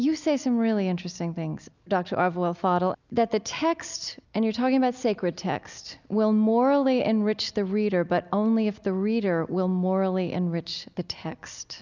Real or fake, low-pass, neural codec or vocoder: real; 7.2 kHz; none